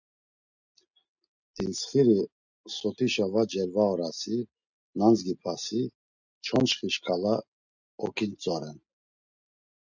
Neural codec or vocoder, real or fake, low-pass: none; real; 7.2 kHz